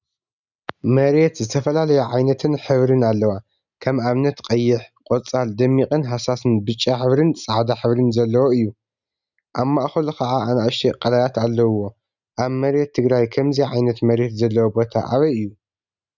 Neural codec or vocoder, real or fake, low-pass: none; real; 7.2 kHz